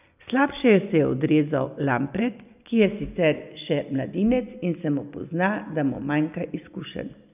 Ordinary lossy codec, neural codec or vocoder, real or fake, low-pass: none; none; real; 3.6 kHz